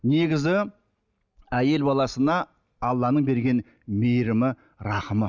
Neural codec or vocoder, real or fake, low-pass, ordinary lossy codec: none; real; 7.2 kHz; none